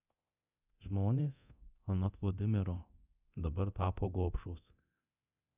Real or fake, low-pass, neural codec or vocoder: fake; 3.6 kHz; codec, 24 kHz, 0.9 kbps, DualCodec